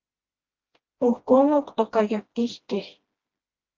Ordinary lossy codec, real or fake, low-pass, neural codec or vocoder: Opus, 32 kbps; fake; 7.2 kHz; codec, 16 kHz, 1 kbps, FreqCodec, smaller model